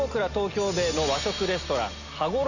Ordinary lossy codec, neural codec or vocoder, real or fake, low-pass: none; none; real; 7.2 kHz